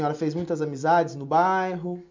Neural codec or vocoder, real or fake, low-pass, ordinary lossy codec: none; real; 7.2 kHz; MP3, 64 kbps